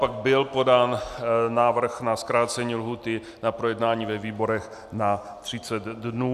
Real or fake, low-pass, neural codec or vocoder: real; 14.4 kHz; none